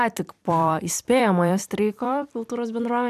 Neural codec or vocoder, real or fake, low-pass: vocoder, 44.1 kHz, 128 mel bands every 256 samples, BigVGAN v2; fake; 14.4 kHz